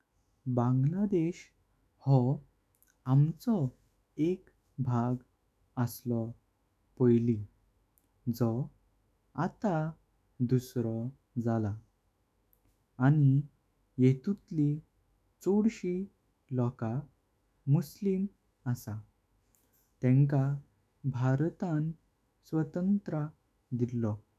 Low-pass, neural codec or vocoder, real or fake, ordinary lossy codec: 14.4 kHz; autoencoder, 48 kHz, 128 numbers a frame, DAC-VAE, trained on Japanese speech; fake; none